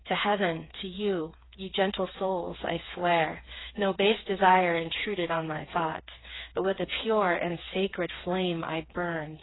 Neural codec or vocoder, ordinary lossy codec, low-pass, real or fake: codec, 16 kHz, 4 kbps, FreqCodec, smaller model; AAC, 16 kbps; 7.2 kHz; fake